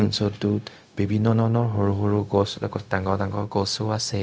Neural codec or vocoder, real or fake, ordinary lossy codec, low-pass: codec, 16 kHz, 0.4 kbps, LongCat-Audio-Codec; fake; none; none